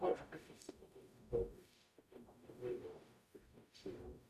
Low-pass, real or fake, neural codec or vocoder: 14.4 kHz; fake; codec, 44.1 kHz, 0.9 kbps, DAC